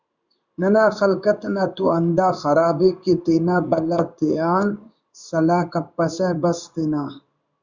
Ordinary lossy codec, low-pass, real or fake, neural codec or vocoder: Opus, 64 kbps; 7.2 kHz; fake; codec, 16 kHz in and 24 kHz out, 1 kbps, XY-Tokenizer